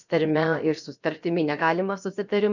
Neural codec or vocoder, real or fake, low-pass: codec, 16 kHz, about 1 kbps, DyCAST, with the encoder's durations; fake; 7.2 kHz